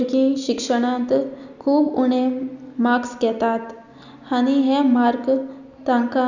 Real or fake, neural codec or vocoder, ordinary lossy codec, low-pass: real; none; none; 7.2 kHz